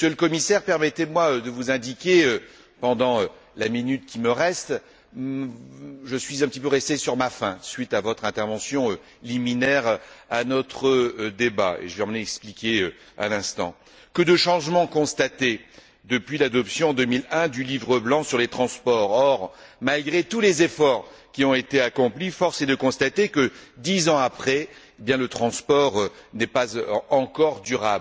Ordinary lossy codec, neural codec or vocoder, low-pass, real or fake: none; none; none; real